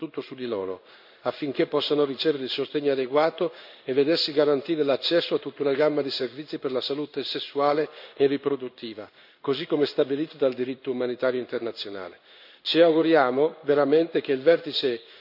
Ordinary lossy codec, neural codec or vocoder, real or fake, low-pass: none; codec, 16 kHz in and 24 kHz out, 1 kbps, XY-Tokenizer; fake; 5.4 kHz